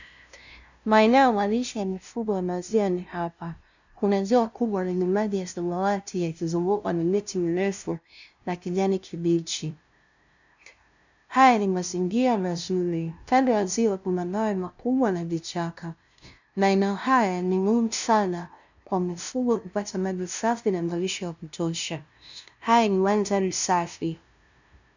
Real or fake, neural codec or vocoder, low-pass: fake; codec, 16 kHz, 0.5 kbps, FunCodec, trained on LibriTTS, 25 frames a second; 7.2 kHz